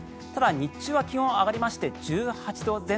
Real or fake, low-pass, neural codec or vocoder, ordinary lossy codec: real; none; none; none